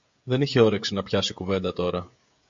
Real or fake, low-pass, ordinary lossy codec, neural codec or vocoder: fake; 7.2 kHz; MP3, 48 kbps; codec, 16 kHz, 16 kbps, FreqCodec, smaller model